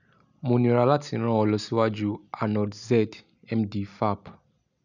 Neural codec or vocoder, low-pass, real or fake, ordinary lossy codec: none; 7.2 kHz; real; none